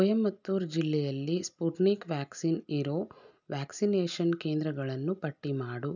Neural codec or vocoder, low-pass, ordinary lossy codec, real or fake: none; 7.2 kHz; none; real